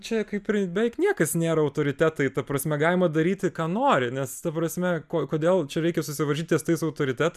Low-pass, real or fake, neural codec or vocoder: 14.4 kHz; real; none